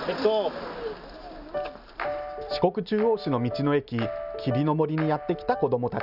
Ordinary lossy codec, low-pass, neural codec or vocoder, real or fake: none; 5.4 kHz; none; real